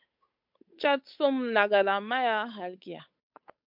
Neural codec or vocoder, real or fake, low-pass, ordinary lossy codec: codec, 16 kHz, 8 kbps, FunCodec, trained on Chinese and English, 25 frames a second; fake; 5.4 kHz; MP3, 48 kbps